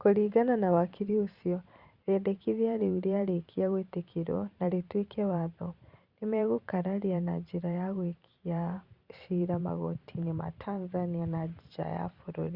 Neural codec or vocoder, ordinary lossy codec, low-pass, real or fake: vocoder, 44.1 kHz, 128 mel bands every 512 samples, BigVGAN v2; Opus, 64 kbps; 5.4 kHz; fake